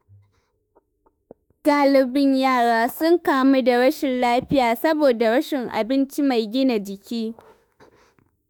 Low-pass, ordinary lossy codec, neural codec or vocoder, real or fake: none; none; autoencoder, 48 kHz, 32 numbers a frame, DAC-VAE, trained on Japanese speech; fake